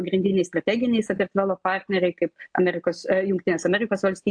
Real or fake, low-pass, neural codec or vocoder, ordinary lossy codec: real; 9.9 kHz; none; AAC, 64 kbps